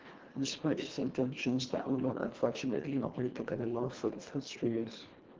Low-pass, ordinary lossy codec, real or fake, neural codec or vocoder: 7.2 kHz; Opus, 16 kbps; fake; codec, 24 kHz, 1.5 kbps, HILCodec